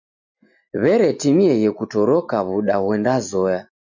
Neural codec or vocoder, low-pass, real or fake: none; 7.2 kHz; real